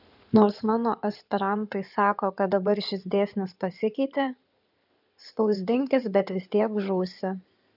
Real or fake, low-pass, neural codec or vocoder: fake; 5.4 kHz; codec, 16 kHz in and 24 kHz out, 2.2 kbps, FireRedTTS-2 codec